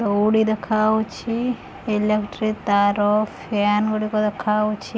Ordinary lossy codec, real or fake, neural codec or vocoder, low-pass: none; real; none; none